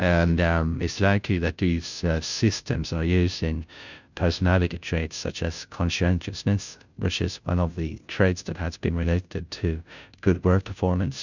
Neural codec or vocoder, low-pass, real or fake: codec, 16 kHz, 0.5 kbps, FunCodec, trained on Chinese and English, 25 frames a second; 7.2 kHz; fake